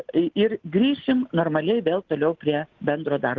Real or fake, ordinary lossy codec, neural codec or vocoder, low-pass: real; Opus, 16 kbps; none; 7.2 kHz